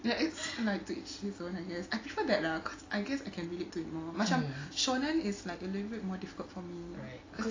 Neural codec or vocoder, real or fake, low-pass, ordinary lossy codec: none; real; 7.2 kHz; AAC, 32 kbps